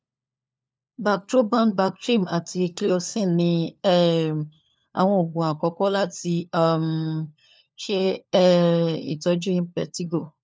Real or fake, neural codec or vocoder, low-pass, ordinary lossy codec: fake; codec, 16 kHz, 4 kbps, FunCodec, trained on LibriTTS, 50 frames a second; none; none